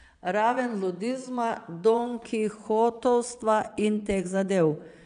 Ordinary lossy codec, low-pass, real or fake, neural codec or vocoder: none; 9.9 kHz; fake; vocoder, 22.05 kHz, 80 mel bands, Vocos